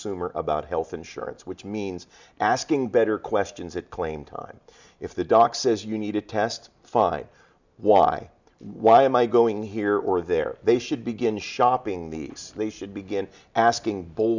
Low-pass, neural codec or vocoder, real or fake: 7.2 kHz; none; real